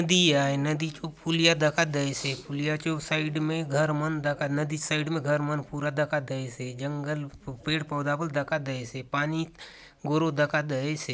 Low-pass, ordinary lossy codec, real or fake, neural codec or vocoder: none; none; real; none